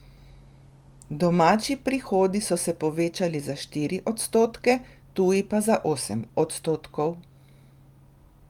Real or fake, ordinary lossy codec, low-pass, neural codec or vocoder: real; Opus, 32 kbps; 19.8 kHz; none